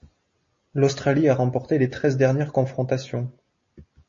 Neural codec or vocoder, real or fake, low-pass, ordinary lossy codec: none; real; 7.2 kHz; MP3, 32 kbps